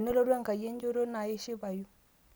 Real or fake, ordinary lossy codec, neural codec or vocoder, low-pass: real; none; none; none